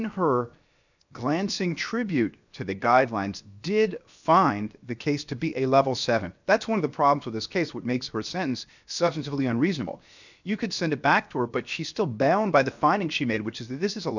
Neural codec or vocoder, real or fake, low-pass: codec, 16 kHz, 0.7 kbps, FocalCodec; fake; 7.2 kHz